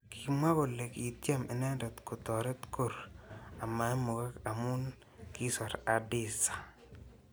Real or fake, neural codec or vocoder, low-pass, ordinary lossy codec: real; none; none; none